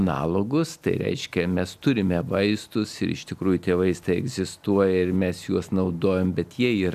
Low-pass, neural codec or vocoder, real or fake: 14.4 kHz; none; real